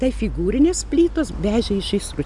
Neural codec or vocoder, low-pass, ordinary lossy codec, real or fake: none; 10.8 kHz; MP3, 96 kbps; real